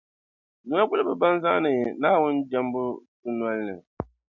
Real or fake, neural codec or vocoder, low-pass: real; none; 7.2 kHz